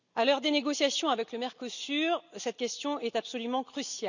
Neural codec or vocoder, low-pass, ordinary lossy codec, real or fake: none; 7.2 kHz; none; real